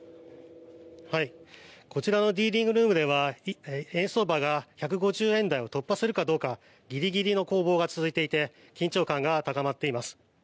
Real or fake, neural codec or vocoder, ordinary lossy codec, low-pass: real; none; none; none